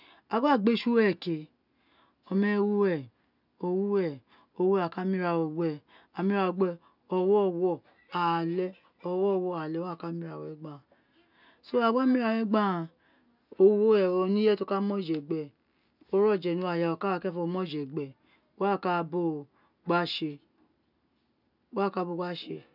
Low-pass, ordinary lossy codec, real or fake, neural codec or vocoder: 5.4 kHz; none; real; none